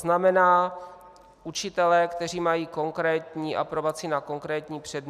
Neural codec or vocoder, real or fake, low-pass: none; real; 14.4 kHz